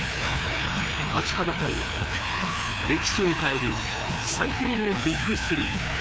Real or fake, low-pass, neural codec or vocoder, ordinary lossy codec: fake; none; codec, 16 kHz, 2 kbps, FreqCodec, larger model; none